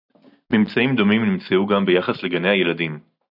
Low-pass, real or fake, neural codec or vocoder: 5.4 kHz; real; none